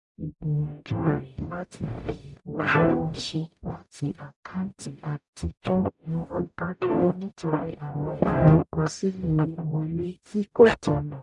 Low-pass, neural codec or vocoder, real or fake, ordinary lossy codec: 10.8 kHz; codec, 44.1 kHz, 0.9 kbps, DAC; fake; none